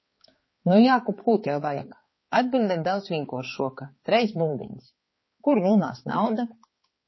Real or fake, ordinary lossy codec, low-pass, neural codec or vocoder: fake; MP3, 24 kbps; 7.2 kHz; codec, 16 kHz, 4 kbps, X-Codec, HuBERT features, trained on balanced general audio